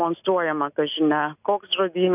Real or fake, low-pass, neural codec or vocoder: real; 3.6 kHz; none